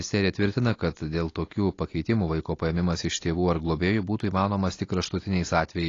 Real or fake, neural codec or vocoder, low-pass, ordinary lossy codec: real; none; 7.2 kHz; AAC, 32 kbps